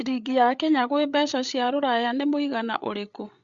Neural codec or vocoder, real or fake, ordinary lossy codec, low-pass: codec, 16 kHz, 8 kbps, FreqCodec, larger model; fake; Opus, 64 kbps; 7.2 kHz